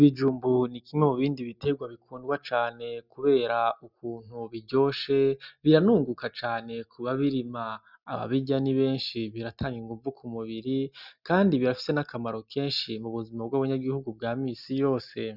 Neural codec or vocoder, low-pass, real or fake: none; 5.4 kHz; real